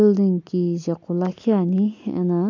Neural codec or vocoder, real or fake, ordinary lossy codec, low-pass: none; real; none; 7.2 kHz